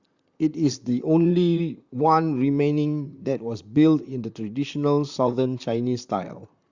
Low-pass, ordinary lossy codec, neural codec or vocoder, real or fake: 7.2 kHz; Opus, 64 kbps; vocoder, 44.1 kHz, 128 mel bands, Pupu-Vocoder; fake